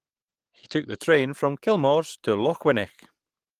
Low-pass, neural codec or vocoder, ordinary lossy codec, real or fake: 14.4 kHz; codec, 44.1 kHz, 7.8 kbps, DAC; Opus, 24 kbps; fake